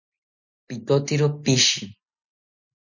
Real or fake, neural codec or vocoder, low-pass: real; none; 7.2 kHz